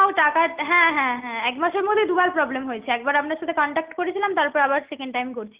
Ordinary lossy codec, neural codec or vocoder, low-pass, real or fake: Opus, 32 kbps; none; 3.6 kHz; real